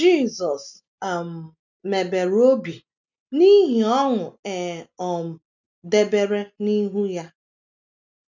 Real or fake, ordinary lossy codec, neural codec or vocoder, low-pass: real; none; none; 7.2 kHz